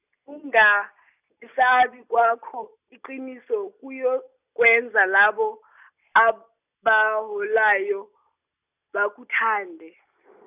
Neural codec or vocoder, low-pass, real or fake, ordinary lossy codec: none; 3.6 kHz; real; none